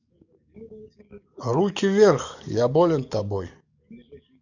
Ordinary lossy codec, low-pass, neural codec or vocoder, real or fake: none; 7.2 kHz; codec, 44.1 kHz, 7.8 kbps, DAC; fake